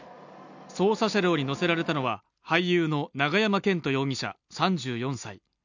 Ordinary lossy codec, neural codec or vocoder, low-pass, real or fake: none; none; 7.2 kHz; real